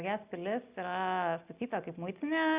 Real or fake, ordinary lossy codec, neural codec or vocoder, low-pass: real; Opus, 64 kbps; none; 3.6 kHz